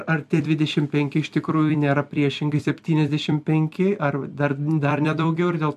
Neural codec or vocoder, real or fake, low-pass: vocoder, 44.1 kHz, 128 mel bands every 256 samples, BigVGAN v2; fake; 14.4 kHz